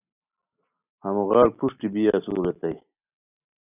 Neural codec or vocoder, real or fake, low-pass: none; real; 3.6 kHz